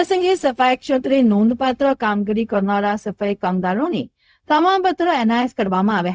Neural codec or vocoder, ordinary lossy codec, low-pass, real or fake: codec, 16 kHz, 0.4 kbps, LongCat-Audio-Codec; none; none; fake